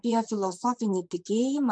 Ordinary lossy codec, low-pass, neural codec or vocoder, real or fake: MP3, 96 kbps; 9.9 kHz; vocoder, 44.1 kHz, 128 mel bands, Pupu-Vocoder; fake